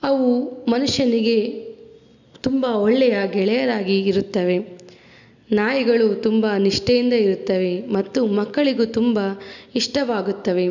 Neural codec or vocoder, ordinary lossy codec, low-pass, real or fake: none; none; 7.2 kHz; real